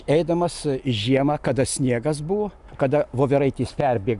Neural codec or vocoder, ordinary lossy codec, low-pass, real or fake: none; Opus, 64 kbps; 10.8 kHz; real